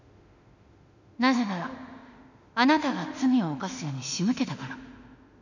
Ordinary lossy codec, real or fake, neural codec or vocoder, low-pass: none; fake; autoencoder, 48 kHz, 32 numbers a frame, DAC-VAE, trained on Japanese speech; 7.2 kHz